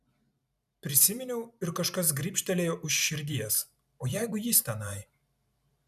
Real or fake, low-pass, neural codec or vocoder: fake; 14.4 kHz; vocoder, 44.1 kHz, 128 mel bands every 512 samples, BigVGAN v2